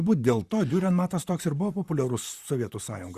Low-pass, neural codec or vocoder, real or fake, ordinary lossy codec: 14.4 kHz; vocoder, 44.1 kHz, 128 mel bands, Pupu-Vocoder; fake; Opus, 64 kbps